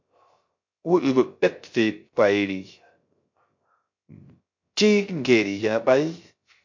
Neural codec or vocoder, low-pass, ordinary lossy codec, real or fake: codec, 16 kHz, 0.3 kbps, FocalCodec; 7.2 kHz; MP3, 48 kbps; fake